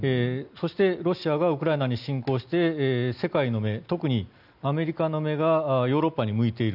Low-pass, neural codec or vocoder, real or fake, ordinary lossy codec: 5.4 kHz; none; real; none